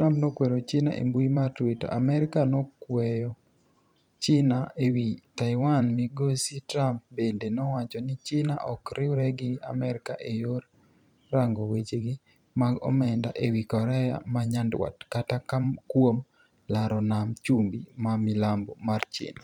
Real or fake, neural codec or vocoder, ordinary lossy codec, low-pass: fake; vocoder, 44.1 kHz, 128 mel bands every 512 samples, BigVGAN v2; none; 19.8 kHz